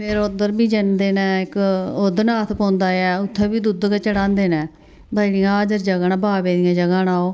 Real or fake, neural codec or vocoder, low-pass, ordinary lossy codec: real; none; none; none